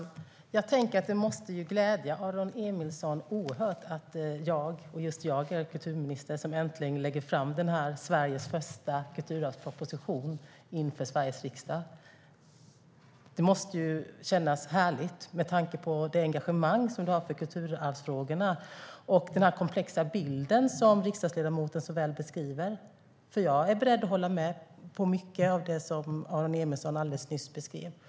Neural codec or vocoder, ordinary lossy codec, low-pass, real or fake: none; none; none; real